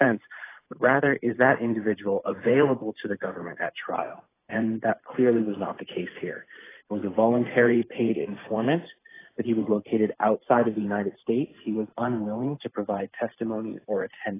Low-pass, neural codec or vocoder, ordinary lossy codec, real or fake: 3.6 kHz; vocoder, 44.1 kHz, 128 mel bands every 512 samples, BigVGAN v2; AAC, 16 kbps; fake